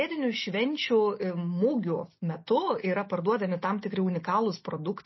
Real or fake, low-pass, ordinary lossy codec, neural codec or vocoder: real; 7.2 kHz; MP3, 24 kbps; none